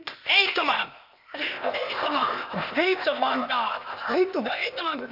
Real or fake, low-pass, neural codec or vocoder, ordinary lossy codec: fake; 5.4 kHz; codec, 16 kHz, 0.8 kbps, ZipCodec; none